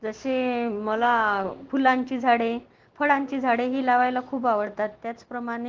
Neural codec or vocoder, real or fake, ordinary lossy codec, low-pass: none; real; Opus, 16 kbps; 7.2 kHz